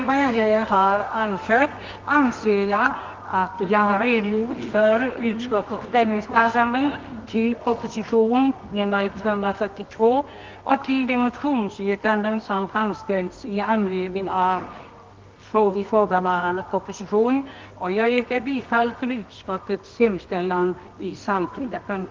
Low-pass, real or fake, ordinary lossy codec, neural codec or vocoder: 7.2 kHz; fake; Opus, 32 kbps; codec, 24 kHz, 0.9 kbps, WavTokenizer, medium music audio release